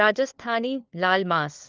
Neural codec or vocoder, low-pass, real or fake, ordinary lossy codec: codec, 16 kHz, 4 kbps, FunCodec, trained on LibriTTS, 50 frames a second; 7.2 kHz; fake; Opus, 32 kbps